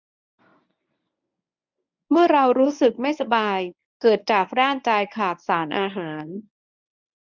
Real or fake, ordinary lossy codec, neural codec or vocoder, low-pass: fake; none; codec, 24 kHz, 0.9 kbps, WavTokenizer, medium speech release version 1; 7.2 kHz